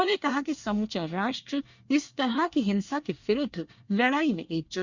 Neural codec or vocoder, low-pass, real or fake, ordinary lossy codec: codec, 24 kHz, 1 kbps, SNAC; 7.2 kHz; fake; Opus, 64 kbps